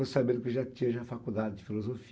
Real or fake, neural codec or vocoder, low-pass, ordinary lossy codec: real; none; none; none